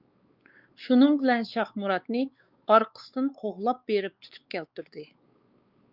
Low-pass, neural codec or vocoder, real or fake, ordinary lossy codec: 5.4 kHz; codec, 16 kHz, 4 kbps, X-Codec, WavLM features, trained on Multilingual LibriSpeech; fake; Opus, 24 kbps